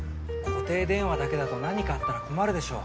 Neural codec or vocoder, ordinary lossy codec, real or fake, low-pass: none; none; real; none